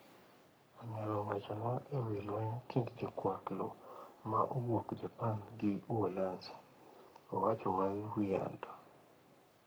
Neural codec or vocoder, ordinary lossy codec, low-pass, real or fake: codec, 44.1 kHz, 3.4 kbps, Pupu-Codec; none; none; fake